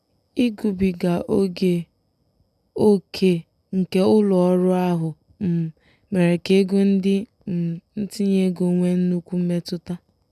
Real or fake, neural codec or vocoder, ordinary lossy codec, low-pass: real; none; none; 14.4 kHz